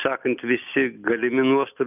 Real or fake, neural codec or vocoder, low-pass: real; none; 3.6 kHz